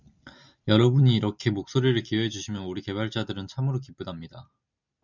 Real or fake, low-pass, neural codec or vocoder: real; 7.2 kHz; none